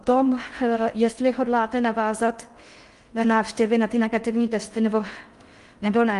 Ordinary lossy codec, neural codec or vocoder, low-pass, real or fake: Opus, 32 kbps; codec, 16 kHz in and 24 kHz out, 0.6 kbps, FocalCodec, streaming, 4096 codes; 10.8 kHz; fake